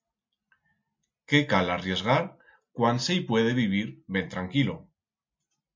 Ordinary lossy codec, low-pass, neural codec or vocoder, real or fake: MP3, 48 kbps; 7.2 kHz; none; real